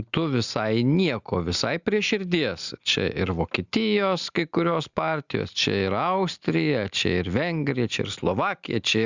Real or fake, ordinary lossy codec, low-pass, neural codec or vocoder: real; Opus, 64 kbps; 7.2 kHz; none